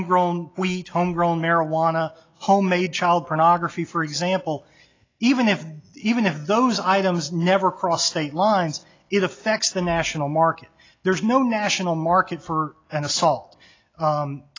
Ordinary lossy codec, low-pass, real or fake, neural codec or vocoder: AAC, 32 kbps; 7.2 kHz; real; none